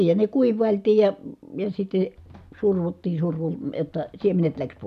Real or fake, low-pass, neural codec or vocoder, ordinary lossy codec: real; 14.4 kHz; none; none